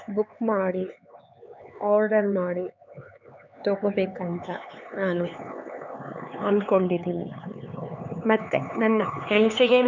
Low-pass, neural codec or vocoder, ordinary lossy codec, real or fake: 7.2 kHz; codec, 16 kHz, 4 kbps, X-Codec, HuBERT features, trained on LibriSpeech; none; fake